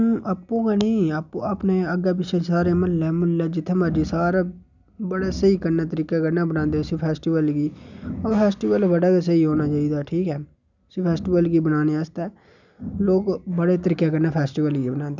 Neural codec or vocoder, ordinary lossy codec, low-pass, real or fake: none; none; 7.2 kHz; real